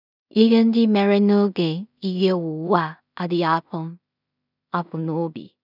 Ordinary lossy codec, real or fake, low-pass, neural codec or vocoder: none; fake; 5.4 kHz; codec, 16 kHz in and 24 kHz out, 0.4 kbps, LongCat-Audio-Codec, two codebook decoder